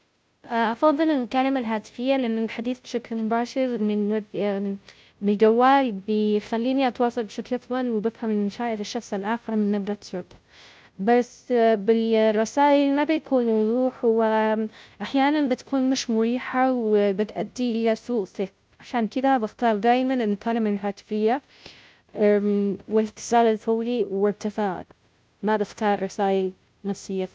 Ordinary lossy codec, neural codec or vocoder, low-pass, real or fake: none; codec, 16 kHz, 0.5 kbps, FunCodec, trained on Chinese and English, 25 frames a second; none; fake